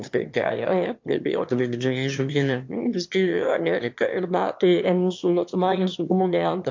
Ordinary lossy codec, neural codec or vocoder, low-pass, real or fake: MP3, 48 kbps; autoencoder, 22.05 kHz, a latent of 192 numbers a frame, VITS, trained on one speaker; 7.2 kHz; fake